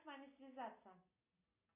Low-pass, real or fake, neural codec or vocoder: 3.6 kHz; real; none